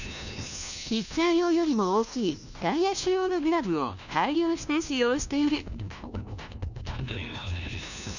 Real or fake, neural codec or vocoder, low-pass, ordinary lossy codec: fake; codec, 16 kHz, 1 kbps, FunCodec, trained on LibriTTS, 50 frames a second; 7.2 kHz; none